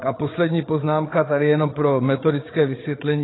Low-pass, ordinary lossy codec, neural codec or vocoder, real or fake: 7.2 kHz; AAC, 16 kbps; codec, 16 kHz, 16 kbps, FreqCodec, larger model; fake